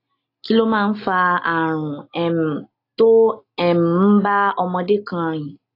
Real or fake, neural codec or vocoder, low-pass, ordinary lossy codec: real; none; 5.4 kHz; AAC, 32 kbps